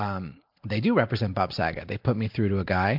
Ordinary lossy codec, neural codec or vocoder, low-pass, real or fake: MP3, 48 kbps; none; 5.4 kHz; real